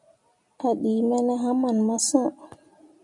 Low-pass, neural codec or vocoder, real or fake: 10.8 kHz; none; real